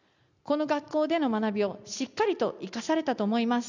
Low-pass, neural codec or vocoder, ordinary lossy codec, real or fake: 7.2 kHz; none; none; real